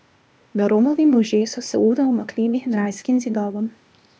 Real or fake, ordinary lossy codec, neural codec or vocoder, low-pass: fake; none; codec, 16 kHz, 0.8 kbps, ZipCodec; none